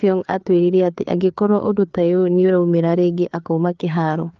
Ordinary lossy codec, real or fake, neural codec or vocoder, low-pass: Opus, 24 kbps; fake; codec, 16 kHz, 4 kbps, FreqCodec, larger model; 7.2 kHz